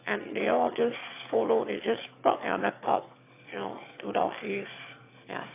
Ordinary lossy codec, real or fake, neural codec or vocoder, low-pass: AAC, 24 kbps; fake; autoencoder, 22.05 kHz, a latent of 192 numbers a frame, VITS, trained on one speaker; 3.6 kHz